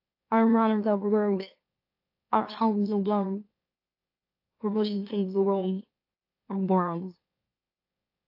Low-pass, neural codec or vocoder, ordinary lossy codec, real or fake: 5.4 kHz; autoencoder, 44.1 kHz, a latent of 192 numbers a frame, MeloTTS; AAC, 32 kbps; fake